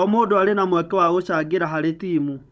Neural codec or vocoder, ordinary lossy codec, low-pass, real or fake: codec, 16 kHz, 16 kbps, FunCodec, trained on Chinese and English, 50 frames a second; none; none; fake